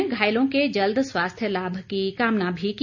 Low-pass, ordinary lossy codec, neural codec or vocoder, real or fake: 7.2 kHz; none; none; real